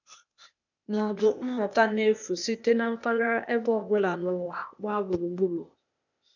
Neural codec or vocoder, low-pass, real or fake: codec, 16 kHz, 0.8 kbps, ZipCodec; 7.2 kHz; fake